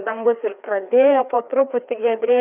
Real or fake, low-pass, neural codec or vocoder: fake; 3.6 kHz; codec, 16 kHz, 2 kbps, FreqCodec, larger model